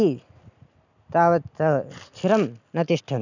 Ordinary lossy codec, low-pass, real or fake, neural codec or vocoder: none; 7.2 kHz; real; none